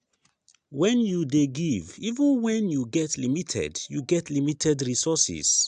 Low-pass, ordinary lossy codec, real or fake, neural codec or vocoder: 9.9 kHz; AAC, 96 kbps; real; none